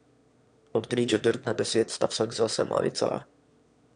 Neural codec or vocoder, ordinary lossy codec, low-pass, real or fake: autoencoder, 22.05 kHz, a latent of 192 numbers a frame, VITS, trained on one speaker; none; 9.9 kHz; fake